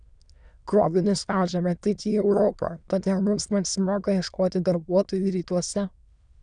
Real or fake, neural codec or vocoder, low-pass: fake; autoencoder, 22.05 kHz, a latent of 192 numbers a frame, VITS, trained on many speakers; 9.9 kHz